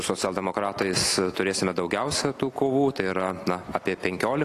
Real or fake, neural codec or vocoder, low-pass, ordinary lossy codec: real; none; 14.4 kHz; AAC, 48 kbps